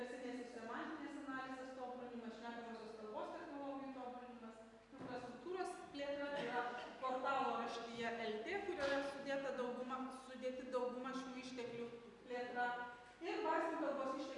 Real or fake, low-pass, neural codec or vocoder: real; 10.8 kHz; none